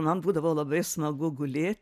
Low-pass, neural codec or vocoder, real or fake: 14.4 kHz; none; real